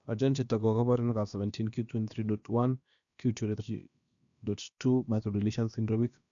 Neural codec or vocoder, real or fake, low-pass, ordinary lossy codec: codec, 16 kHz, about 1 kbps, DyCAST, with the encoder's durations; fake; 7.2 kHz; none